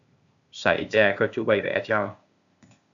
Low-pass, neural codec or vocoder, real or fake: 7.2 kHz; codec, 16 kHz, 0.8 kbps, ZipCodec; fake